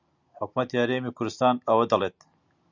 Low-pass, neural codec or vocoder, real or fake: 7.2 kHz; none; real